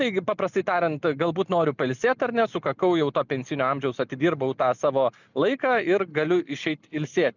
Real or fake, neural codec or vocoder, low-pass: real; none; 7.2 kHz